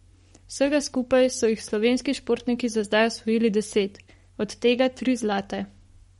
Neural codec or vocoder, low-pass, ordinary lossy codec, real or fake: codec, 44.1 kHz, 7.8 kbps, Pupu-Codec; 19.8 kHz; MP3, 48 kbps; fake